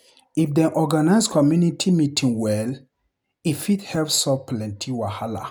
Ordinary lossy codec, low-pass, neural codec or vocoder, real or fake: none; none; none; real